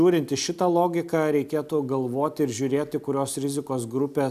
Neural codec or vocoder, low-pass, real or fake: none; 14.4 kHz; real